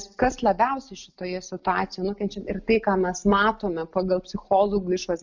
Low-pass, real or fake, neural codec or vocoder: 7.2 kHz; real; none